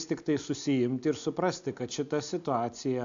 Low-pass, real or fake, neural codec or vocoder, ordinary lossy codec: 7.2 kHz; real; none; AAC, 64 kbps